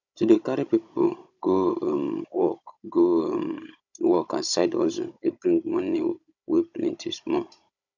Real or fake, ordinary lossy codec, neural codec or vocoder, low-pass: fake; none; codec, 16 kHz, 16 kbps, FunCodec, trained on Chinese and English, 50 frames a second; 7.2 kHz